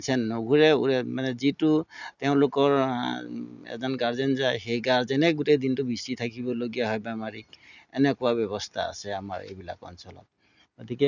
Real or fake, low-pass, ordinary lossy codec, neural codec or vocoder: real; 7.2 kHz; none; none